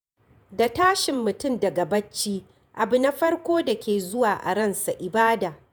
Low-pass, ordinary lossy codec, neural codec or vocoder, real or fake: none; none; vocoder, 48 kHz, 128 mel bands, Vocos; fake